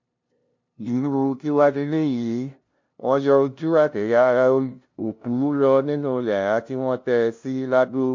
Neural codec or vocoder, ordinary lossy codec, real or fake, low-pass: codec, 16 kHz, 0.5 kbps, FunCodec, trained on LibriTTS, 25 frames a second; MP3, 48 kbps; fake; 7.2 kHz